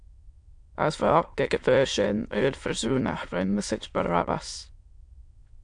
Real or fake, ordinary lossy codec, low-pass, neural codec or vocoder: fake; MP3, 64 kbps; 9.9 kHz; autoencoder, 22.05 kHz, a latent of 192 numbers a frame, VITS, trained on many speakers